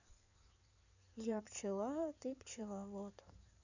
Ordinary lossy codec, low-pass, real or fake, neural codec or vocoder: none; 7.2 kHz; fake; codec, 16 kHz in and 24 kHz out, 2.2 kbps, FireRedTTS-2 codec